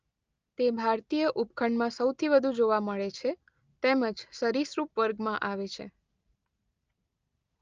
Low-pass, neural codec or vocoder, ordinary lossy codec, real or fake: 7.2 kHz; none; Opus, 24 kbps; real